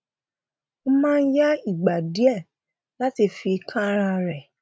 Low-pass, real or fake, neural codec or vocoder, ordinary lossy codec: none; real; none; none